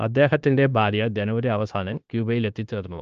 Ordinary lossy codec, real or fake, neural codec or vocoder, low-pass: Opus, 24 kbps; fake; codec, 16 kHz, 0.9 kbps, LongCat-Audio-Codec; 7.2 kHz